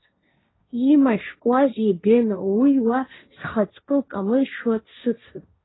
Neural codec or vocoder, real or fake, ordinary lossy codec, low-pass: codec, 16 kHz, 1.1 kbps, Voila-Tokenizer; fake; AAC, 16 kbps; 7.2 kHz